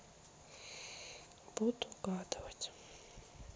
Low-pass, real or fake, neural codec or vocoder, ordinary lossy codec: none; real; none; none